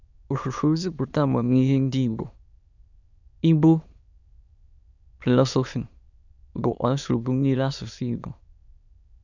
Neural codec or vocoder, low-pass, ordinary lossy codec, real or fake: autoencoder, 22.05 kHz, a latent of 192 numbers a frame, VITS, trained on many speakers; 7.2 kHz; none; fake